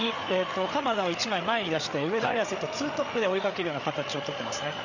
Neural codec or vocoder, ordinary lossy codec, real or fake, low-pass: codec, 16 kHz, 8 kbps, FreqCodec, larger model; none; fake; 7.2 kHz